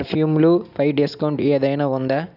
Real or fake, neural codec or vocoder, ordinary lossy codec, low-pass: real; none; none; 5.4 kHz